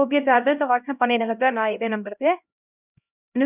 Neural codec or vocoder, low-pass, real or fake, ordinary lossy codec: codec, 16 kHz, 0.5 kbps, X-Codec, HuBERT features, trained on LibriSpeech; 3.6 kHz; fake; none